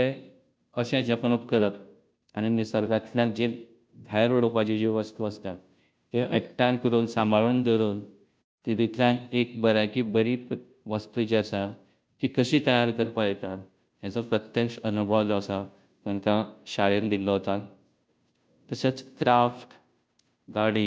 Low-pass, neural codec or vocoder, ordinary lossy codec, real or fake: none; codec, 16 kHz, 0.5 kbps, FunCodec, trained on Chinese and English, 25 frames a second; none; fake